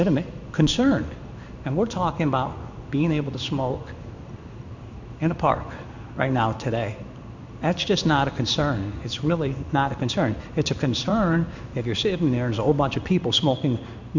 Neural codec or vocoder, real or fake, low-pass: codec, 16 kHz in and 24 kHz out, 1 kbps, XY-Tokenizer; fake; 7.2 kHz